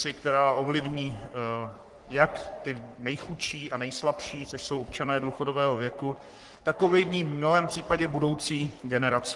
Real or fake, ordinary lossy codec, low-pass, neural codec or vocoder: fake; Opus, 24 kbps; 10.8 kHz; codec, 44.1 kHz, 3.4 kbps, Pupu-Codec